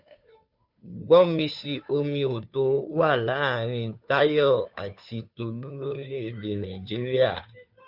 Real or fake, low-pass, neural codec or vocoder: fake; 5.4 kHz; codec, 16 kHz, 2 kbps, FunCodec, trained on Chinese and English, 25 frames a second